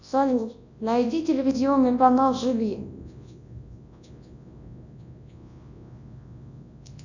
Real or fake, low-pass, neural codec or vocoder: fake; 7.2 kHz; codec, 24 kHz, 0.9 kbps, WavTokenizer, large speech release